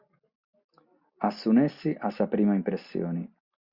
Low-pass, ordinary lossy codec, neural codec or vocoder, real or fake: 5.4 kHz; Opus, 64 kbps; none; real